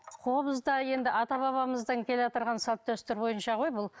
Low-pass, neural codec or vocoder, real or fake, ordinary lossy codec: none; none; real; none